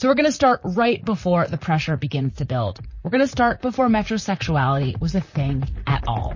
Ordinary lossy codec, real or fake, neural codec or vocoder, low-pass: MP3, 32 kbps; fake; vocoder, 44.1 kHz, 128 mel bands, Pupu-Vocoder; 7.2 kHz